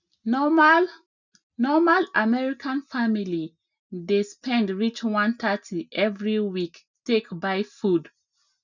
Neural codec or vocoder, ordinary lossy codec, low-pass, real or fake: none; none; 7.2 kHz; real